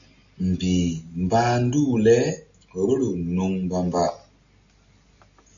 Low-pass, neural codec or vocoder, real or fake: 7.2 kHz; none; real